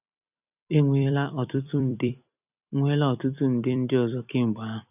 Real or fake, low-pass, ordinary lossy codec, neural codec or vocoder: fake; 3.6 kHz; none; vocoder, 44.1 kHz, 128 mel bands every 256 samples, BigVGAN v2